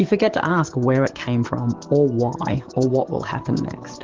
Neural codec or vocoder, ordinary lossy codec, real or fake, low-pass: codec, 44.1 kHz, 7.8 kbps, DAC; Opus, 16 kbps; fake; 7.2 kHz